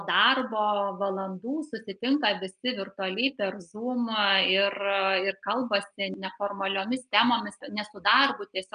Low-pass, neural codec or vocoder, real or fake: 9.9 kHz; none; real